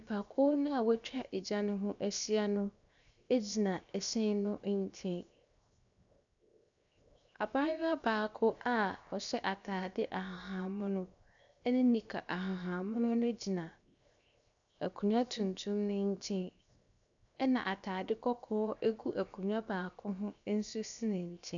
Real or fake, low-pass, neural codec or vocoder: fake; 7.2 kHz; codec, 16 kHz, 0.7 kbps, FocalCodec